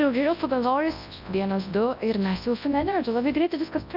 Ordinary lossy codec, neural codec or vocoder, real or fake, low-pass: AAC, 48 kbps; codec, 24 kHz, 0.9 kbps, WavTokenizer, large speech release; fake; 5.4 kHz